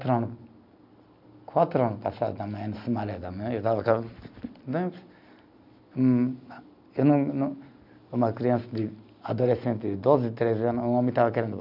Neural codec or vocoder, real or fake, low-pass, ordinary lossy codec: none; real; 5.4 kHz; none